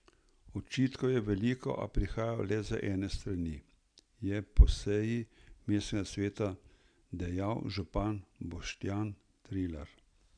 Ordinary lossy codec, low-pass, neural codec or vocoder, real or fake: MP3, 96 kbps; 9.9 kHz; none; real